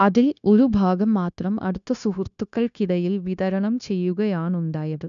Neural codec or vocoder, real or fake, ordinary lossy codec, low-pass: codec, 16 kHz, 0.9 kbps, LongCat-Audio-Codec; fake; none; 7.2 kHz